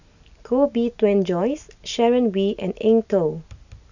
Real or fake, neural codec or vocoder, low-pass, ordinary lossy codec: real; none; 7.2 kHz; none